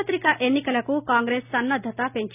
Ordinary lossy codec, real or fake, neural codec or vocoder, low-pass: none; real; none; 3.6 kHz